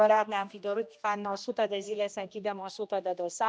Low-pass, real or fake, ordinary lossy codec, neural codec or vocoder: none; fake; none; codec, 16 kHz, 1 kbps, X-Codec, HuBERT features, trained on general audio